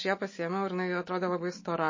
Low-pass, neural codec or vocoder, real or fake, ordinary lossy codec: 7.2 kHz; none; real; MP3, 32 kbps